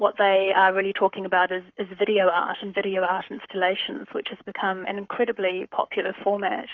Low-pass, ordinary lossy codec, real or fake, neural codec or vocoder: 7.2 kHz; Opus, 64 kbps; fake; codec, 44.1 kHz, 7.8 kbps, Pupu-Codec